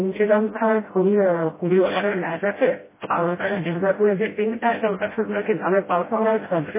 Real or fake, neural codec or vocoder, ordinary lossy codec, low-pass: fake; codec, 16 kHz, 0.5 kbps, FreqCodec, smaller model; MP3, 16 kbps; 3.6 kHz